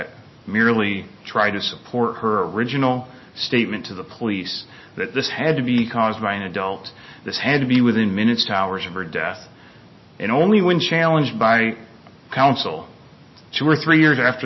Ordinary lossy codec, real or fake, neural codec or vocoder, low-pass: MP3, 24 kbps; real; none; 7.2 kHz